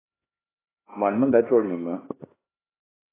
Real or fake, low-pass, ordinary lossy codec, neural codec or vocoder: fake; 3.6 kHz; AAC, 16 kbps; codec, 16 kHz, 2 kbps, X-Codec, HuBERT features, trained on LibriSpeech